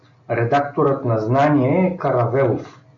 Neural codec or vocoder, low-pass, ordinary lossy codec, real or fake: none; 7.2 kHz; MP3, 64 kbps; real